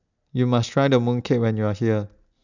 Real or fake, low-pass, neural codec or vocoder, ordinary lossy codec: real; 7.2 kHz; none; none